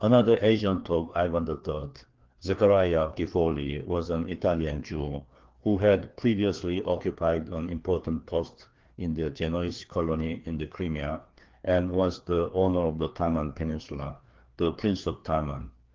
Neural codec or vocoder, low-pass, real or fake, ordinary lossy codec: codec, 16 kHz, 2 kbps, FreqCodec, larger model; 7.2 kHz; fake; Opus, 16 kbps